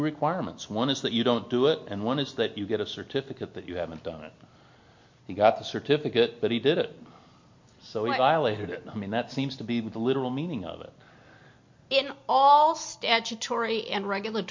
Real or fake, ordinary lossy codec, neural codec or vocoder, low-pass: real; MP3, 48 kbps; none; 7.2 kHz